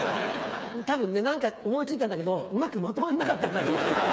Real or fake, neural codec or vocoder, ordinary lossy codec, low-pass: fake; codec, 16 kHz, 4 kbps, FreqCodec, smaller model; none; none